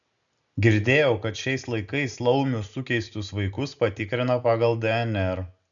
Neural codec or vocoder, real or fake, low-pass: none; real; 7.2 kHz